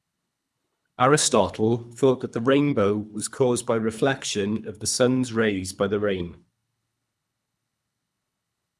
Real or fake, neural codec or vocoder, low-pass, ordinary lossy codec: fake; codec, 24 kHz, 3 kbps, HILCodec; none; none